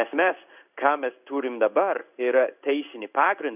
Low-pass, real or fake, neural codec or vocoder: 3.6 kHz; fake; codec, 16 kHz in and 24 kHz out, 1 kbps, XY-Tokenizer